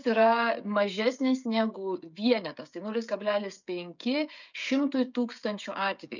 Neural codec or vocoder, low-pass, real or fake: codec, 16 kHz, 16 kbps, FreqCodec, smaller model; 7.2 kHz; fake